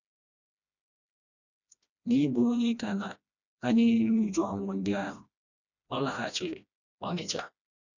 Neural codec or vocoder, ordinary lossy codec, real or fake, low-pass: codec, 16 kHz, 1 kbps, FreqCodec, smaller model; none; fake; 7.2 kHz